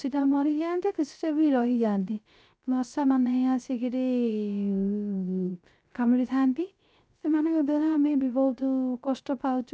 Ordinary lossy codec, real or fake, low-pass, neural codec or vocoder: none; fake; none; codec, 16 kHz, 0.3 kbps, FocalCodec